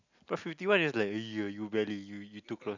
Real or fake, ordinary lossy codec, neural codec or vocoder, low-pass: real; none; none; 7.2 kHz